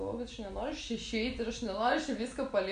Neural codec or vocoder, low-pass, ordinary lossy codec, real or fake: none; 9.9 kHz; AAC, 64 kbps; real